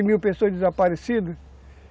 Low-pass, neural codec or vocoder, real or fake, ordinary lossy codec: none; none; real; none